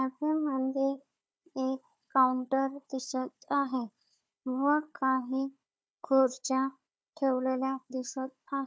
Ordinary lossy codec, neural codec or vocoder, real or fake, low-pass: none; codec, 16 kHz, 4 kbps, FunCodec, trained on Chinese and English, 50 frames a second; fake; none